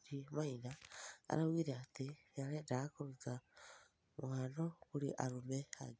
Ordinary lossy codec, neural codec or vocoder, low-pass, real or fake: none; none; none; real